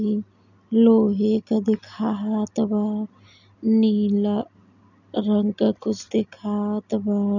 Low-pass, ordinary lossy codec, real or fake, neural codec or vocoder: 7.2 kHz; none; real; none